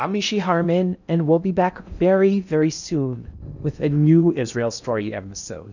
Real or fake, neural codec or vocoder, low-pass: fake; codec, 16 kHz in and 24 kHz out, 0.6 kbps, FocalCodec, streaming, 2048 codes; 7.2 kHz